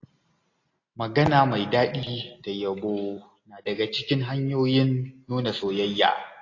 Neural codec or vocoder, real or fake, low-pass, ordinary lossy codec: none; real; 7.2 kHz; AAC, 32 kbps